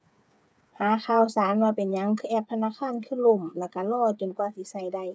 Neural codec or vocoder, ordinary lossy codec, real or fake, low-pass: codec, 16 kHz, 8 kbps, FreqCodec, smaller model; none; fake; none